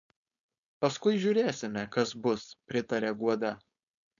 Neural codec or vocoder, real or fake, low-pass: codec, 16 kHz, 4.8 kbps, FACodec; fake; 7.2 kHz